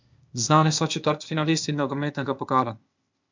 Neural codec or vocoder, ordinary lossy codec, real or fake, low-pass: codec, 16 kHz, 0.8 kbps, ZipCodec; MP3, 64 kbps; fake; 7.2 kHz